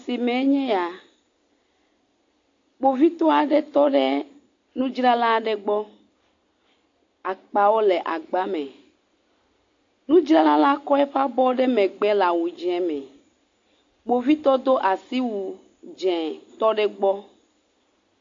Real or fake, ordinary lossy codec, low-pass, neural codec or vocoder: real; AAC, 48 kbps; 7.2 kHz; none